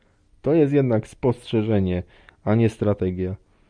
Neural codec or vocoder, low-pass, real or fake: none; 9.9 kHz; real